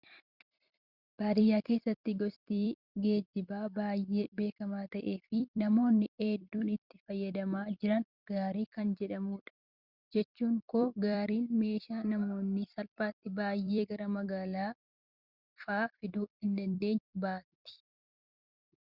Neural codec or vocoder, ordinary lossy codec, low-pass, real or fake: vocoder, 44.1 kHz, 128 mel bands every 256 samples, BigVGAN v2; Opus, 64 kbps; 5.4 kHz; fake